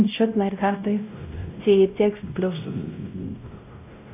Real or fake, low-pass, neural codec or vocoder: fake; 3.6 kHz; codec, 16 kHz, 0.5 kbps, X-Codec, WavLM features, trained on Multilingual LibriSpeech